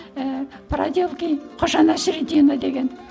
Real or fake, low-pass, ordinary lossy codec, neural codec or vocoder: real; none; none; none